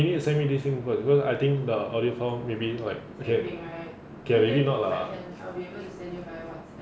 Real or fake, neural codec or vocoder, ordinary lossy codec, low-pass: real; none; none; none